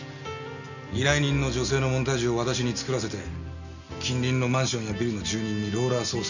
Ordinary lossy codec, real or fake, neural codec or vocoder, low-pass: none; real; none; 7.2 kHz